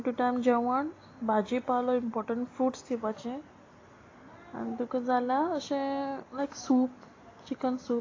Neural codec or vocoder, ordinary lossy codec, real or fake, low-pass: none; AAC, 32 kbps; real; 7.2 kHz